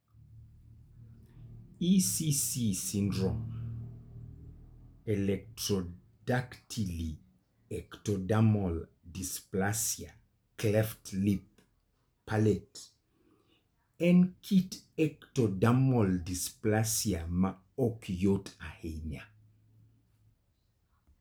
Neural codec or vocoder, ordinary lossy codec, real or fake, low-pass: none; none; real; none